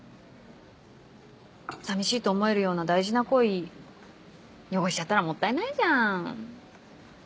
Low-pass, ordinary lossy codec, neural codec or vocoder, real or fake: none; none; none; real